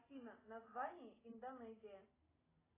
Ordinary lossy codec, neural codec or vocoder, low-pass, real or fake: AAC, 16 kbps; none; 3.6 kHz; real